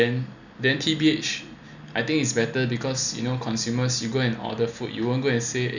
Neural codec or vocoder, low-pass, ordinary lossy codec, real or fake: none; 7.2 kHz; none; real